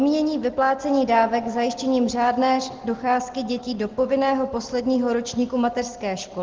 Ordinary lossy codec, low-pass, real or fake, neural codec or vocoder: Opus, 16 kbps; 7.2 kHz; real; none